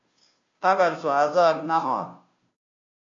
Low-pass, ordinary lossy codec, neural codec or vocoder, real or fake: 7.2 kHz; MP3, 48 kbps; codec, 16 kHz, 0.5 kbps, FunCodec, trained on Chinese and English, 25 frames a second; fake